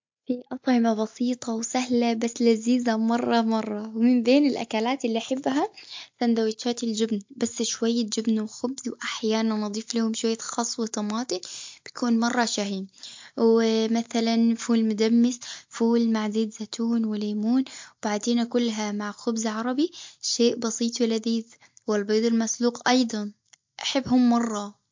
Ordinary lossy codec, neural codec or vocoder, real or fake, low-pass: MP3, 48 kbps; none; real; 7.2 kHz